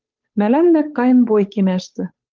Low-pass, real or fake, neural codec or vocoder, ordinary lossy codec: 7.2 kHz; fake; codec, 16 kHz, 8 kbps, FunCodec, trained on Chinese and English, 25 frames a second; Opus, 32 kbps